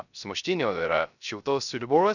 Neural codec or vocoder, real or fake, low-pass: codec, 16 kHz, 0.3 kbps, FocalCodec; fake; 7.2 kHz